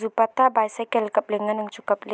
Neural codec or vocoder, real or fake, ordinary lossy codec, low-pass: none; real; none; none